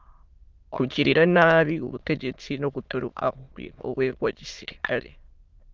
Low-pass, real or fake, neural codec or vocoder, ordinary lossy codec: 7.2 kHz; fake; autoencoder, 22.05 kHz, a latent of 192 numbers a frame, VITS, trained on many speakers; Opus, 24 kbps